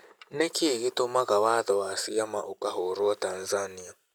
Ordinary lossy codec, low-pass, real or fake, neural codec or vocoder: none; none; real; none